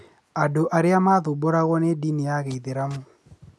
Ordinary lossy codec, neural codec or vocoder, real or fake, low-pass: none; none; real; none